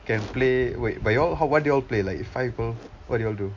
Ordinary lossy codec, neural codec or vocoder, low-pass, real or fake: MP3, 48 kbps; none; 7.2 kHz; real